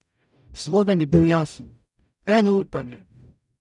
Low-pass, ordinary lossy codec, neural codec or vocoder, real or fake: 10.8 kHz; none; codec, 44.1 kHz, 0.9 kbps, DAC; fake